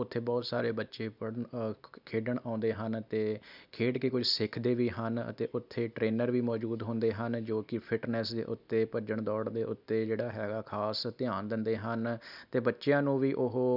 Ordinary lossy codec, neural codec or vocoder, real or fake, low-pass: none; none; real; 5.4 kHz